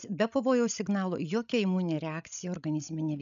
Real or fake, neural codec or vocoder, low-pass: fake; codec, 16 kHz, 16 kbps, FreqCodec, larger model; 7.2 kHz